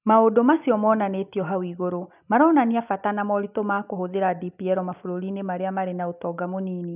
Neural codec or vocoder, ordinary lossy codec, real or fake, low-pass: none; none; real; 3.6 kHz